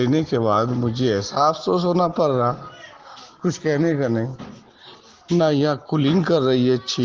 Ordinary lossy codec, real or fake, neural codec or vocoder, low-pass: Opus, 16 kbps; real; none; 7.2 kHz